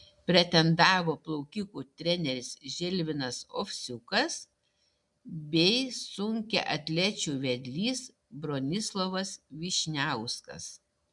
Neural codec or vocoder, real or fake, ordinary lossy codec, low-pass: none; real; MP3, 96 kbps; 10.8 kHz